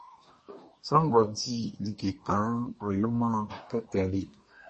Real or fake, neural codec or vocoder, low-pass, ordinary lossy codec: fake; codec, 24 kHz, 1 kbps, SNAC; 10.8 kHz; MP3, 32 kbps